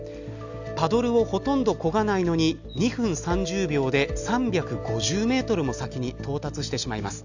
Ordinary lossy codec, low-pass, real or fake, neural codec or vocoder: none; 7.2 kHz; real; none